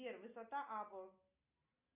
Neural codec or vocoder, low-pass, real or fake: none; 3.6 kHz; real